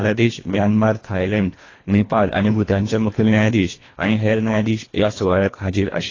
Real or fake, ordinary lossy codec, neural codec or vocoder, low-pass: fake; AAC, 32 kbps; codec, 24 kHz, 1.5 kbps, HILCodec; 7.2 kHz